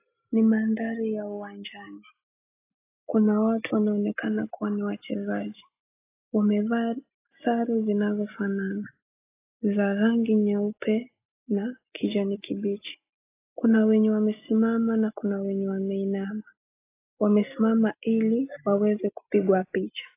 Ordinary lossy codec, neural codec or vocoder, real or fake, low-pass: AAC, 24 kbps; none; real; 3.6 kHz